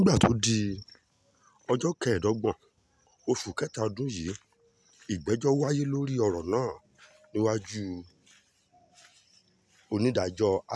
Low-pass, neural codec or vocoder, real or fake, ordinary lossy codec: none; none; real; none